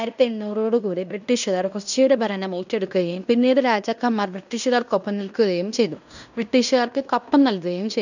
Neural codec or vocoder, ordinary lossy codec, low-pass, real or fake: codec, 16 kHz in and 24 kHz out, 0.9 kbps, LongCat-Audio-Codec, fine tuned four codebook decoder; none; 7.2 kHz; fake